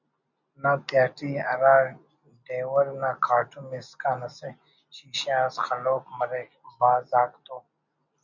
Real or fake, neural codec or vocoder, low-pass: real; none; 7.2 kHz